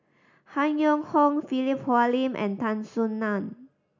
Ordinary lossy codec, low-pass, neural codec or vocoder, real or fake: none; 7.2 kHz; none; real